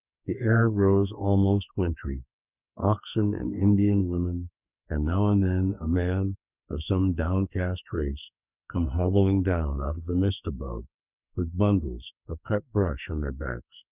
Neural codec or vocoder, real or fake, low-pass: codec, 44.1 kHz, 2.6 kbps, SNAC; fake; 3.6 kHz